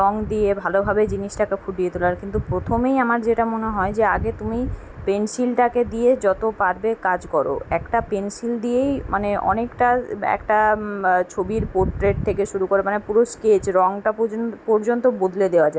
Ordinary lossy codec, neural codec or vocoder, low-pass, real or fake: none; none; none; real